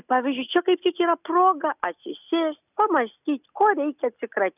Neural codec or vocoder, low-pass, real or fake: none; 3.6 kHz; real